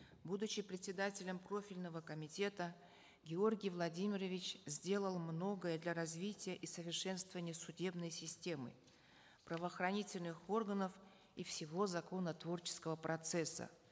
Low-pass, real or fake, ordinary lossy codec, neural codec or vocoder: none; real; none; none